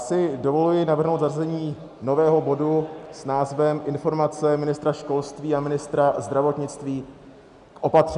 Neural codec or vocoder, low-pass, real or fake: none; 10.8 kHz; real